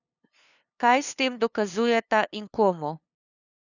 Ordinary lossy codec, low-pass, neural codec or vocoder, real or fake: none; 7.2 kHz; codec, 16 kHz, 2 kbps, FunCodec, trained on LibriTTS, 25 frames a second; fake